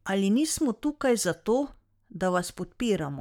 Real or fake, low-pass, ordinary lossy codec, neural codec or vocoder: fake; 19.8 kHz; none; codec, 44.1 kHz, 7.8 kbps, Pupu-Codec